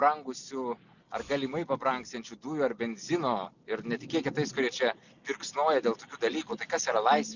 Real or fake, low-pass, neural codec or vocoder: real; 7.2 kHz; none